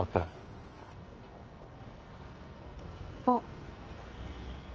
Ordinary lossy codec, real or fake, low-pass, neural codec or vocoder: Opus, 24 kbps; fake; 7.2 kHz; codec, 44.1 kHz, 2.6 kbps, SNAC